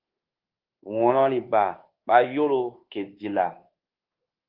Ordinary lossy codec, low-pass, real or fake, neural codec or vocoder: Opus, 16 kbps; 5.4 kHz; fake; codec, 24 kHz, 1.2 kbps, DualCodec